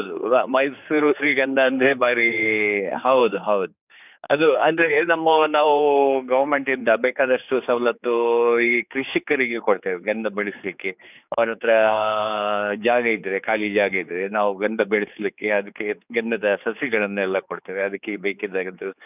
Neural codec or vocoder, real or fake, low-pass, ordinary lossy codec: codec, 16 kHz, 4 kbps, X-Codec, HuBERT features, trained on general audio; fake; 3.6 kHz; none